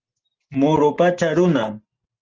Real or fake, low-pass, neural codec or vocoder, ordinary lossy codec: real; 7.2 kHz; none; Opus, 16 kbps